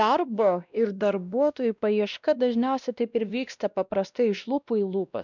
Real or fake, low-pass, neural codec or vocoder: fake; 7.2 kHz; codec, 16 kHz, 1 kbps, X-Codec, WavLM features, trained on Multilingual LibriSpeech